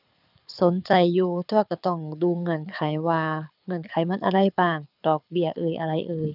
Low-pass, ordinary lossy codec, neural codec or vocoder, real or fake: 5.4 kHz; none; codec, 24 kHz, 6 kbps, HILCodec; fake